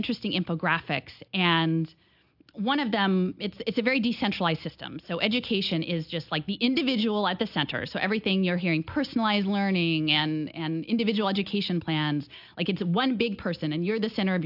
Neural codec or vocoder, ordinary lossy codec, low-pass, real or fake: none; AAC, 48 kbps; 5.4 kHz; real